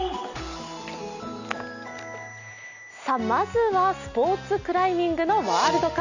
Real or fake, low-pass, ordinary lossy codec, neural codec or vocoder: real; 7.2 kHz; none; none